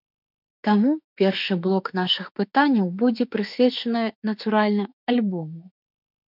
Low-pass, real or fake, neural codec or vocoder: 5.4 kHz; fake; autoencoder, 48 kHz, 32 numbers a frame, DAC-VAE, trained on Japanese speech